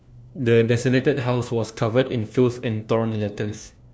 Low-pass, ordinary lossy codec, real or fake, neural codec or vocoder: none; none; fake; codec, 16 kHz, 1 kbps, FunCodec, trained on LibriTTS, 50 frames a second